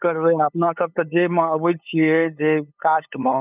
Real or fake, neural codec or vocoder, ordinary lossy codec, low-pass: fake; codec, 16 kHz, 8 kbps, FunCodec, trained on LibriTTS, 25 frames a second; none; 3.6 kHz